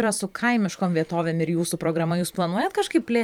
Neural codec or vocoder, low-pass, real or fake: vocoder, 44.1 kHz, 128 mel bands every 512 samples, BigVGAN v2; 19.8 kHz; fake